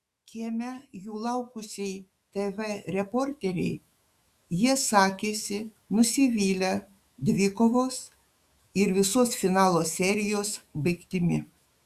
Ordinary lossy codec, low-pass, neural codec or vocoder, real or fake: Opus, 64 kbps; 14.4 kHz; autoencoder, 48 kHz, 128 numbers a frame, DAC-VAE, trained on Japanese speech; fake